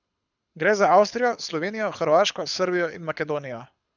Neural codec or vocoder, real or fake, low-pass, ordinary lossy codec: codec, 24 kHz, 6 kbps, HILCodec; fake; 7.2 kHz; none